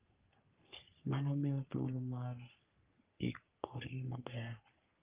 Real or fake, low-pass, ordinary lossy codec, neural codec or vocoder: fake; 3.6 kHz; Opus, 24 kbps; codec, 44.1 kHz, 3.4 kbps, Pupu-Codec